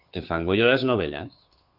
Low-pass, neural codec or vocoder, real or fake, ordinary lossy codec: 5.4 kHz; codec, 16 kHz, 2 kbps, FunCodec, trained on Chinese and English, 25 frames a second; fake; Opus, 64 kbps